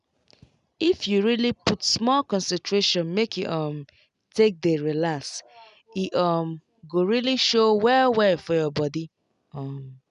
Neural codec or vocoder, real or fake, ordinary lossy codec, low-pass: none; real; none; 10.8 kHz